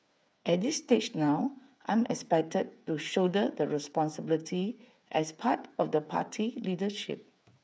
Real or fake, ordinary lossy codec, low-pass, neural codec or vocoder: fake; none; none; codec, 16 kHz, 8 kbps, FreqCodec, smaller model